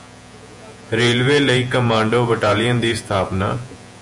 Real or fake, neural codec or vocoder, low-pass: fake; vocoder, 48 kHz, 128 mel bands, Vocos; 10.8 kHz